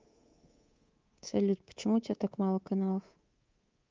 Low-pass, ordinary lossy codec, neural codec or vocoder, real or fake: 7.2 kHz; Opus, 32 kbps; codec, 24 kHz, 3.1 kbps, DualCodec; fake